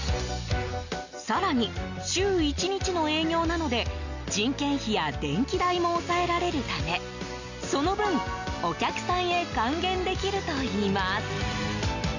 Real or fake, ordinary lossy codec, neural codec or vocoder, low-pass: real; none; none; 7.2 kHz